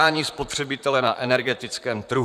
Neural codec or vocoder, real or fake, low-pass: vocoder, 44.1 kHz, 128 mel bands, Pupu-Vocoder; fake; 14.4 kHz